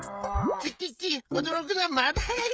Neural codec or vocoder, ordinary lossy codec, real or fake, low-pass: codec, 16 kHz, 16 kbps, FreqCodec, smaller model; none; fake; none